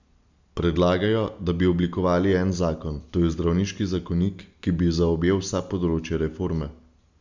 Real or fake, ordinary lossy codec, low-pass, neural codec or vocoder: real; Opus, 64 kbps; 7.2 kHz; none